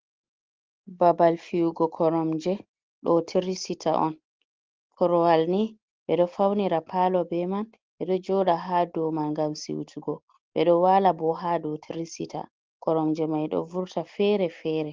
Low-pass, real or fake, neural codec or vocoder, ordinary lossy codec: 7.2 kHz; real; none; Opus, 16 kbps